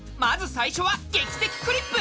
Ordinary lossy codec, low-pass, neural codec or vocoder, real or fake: none; none; none; real